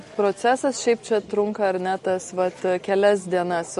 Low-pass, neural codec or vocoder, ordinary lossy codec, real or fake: 14.4 kHz; none; MP3, 48 kbps; real